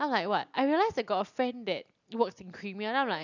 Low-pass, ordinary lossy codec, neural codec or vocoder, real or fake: 7.2 kHz; none; none; real